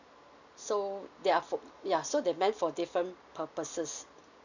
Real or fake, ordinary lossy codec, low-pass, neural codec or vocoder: real; none; 7.2 kHz; none